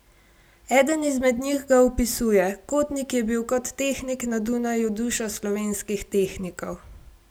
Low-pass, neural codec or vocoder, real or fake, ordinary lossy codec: none; none; real; none